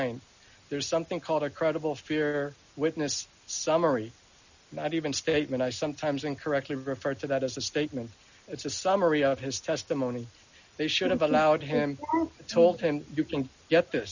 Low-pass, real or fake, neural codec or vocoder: 7.2 kHz; real; none